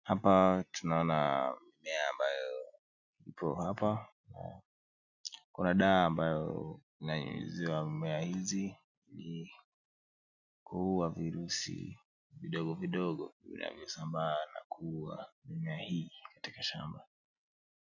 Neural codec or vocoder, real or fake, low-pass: none; real; 7.2 kHz